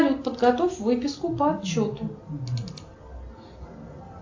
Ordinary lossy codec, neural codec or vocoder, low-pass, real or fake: AAC, 48 kbps; none; 7.2 kHz; real